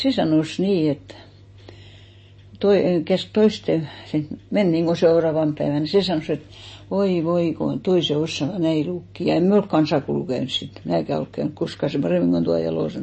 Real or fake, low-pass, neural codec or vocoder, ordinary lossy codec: real; 9.9 kHz; none; MP3, 32 kbps